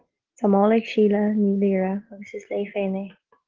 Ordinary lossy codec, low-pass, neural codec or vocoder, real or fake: Opus, 16 kbps; 7.2 kHz; none; real